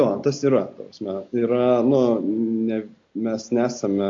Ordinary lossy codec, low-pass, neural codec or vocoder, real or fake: AAC, 48 kbps; 7.2 kHz; none; real